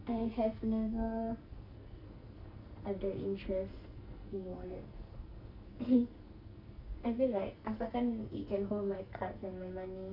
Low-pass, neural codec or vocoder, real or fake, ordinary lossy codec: 5.4 kHz; codec, 44.1 kHz, 2.6 kbps, SNAC; fake; none